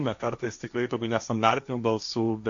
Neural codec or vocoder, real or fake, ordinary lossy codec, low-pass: codec, 16 kHz, 1.1 kbps, Voila-Tokenizer; fake; AAC, 64 kbps; 7.2 kHz